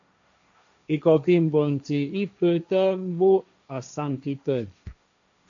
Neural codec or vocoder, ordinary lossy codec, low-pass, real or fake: codec, 16 kHz, 1.1 kbps, Voila-Tokenizer; MP3, 96 kbps; 7.2 kHz; fake